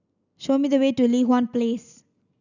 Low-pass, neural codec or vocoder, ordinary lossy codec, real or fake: 7.2 kHz; none; none; real